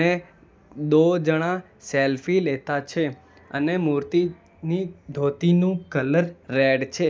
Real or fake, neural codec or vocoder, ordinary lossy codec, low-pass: real; none; none; none